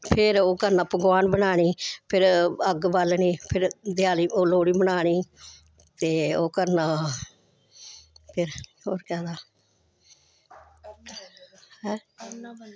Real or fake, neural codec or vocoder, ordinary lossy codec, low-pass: real; none; none; none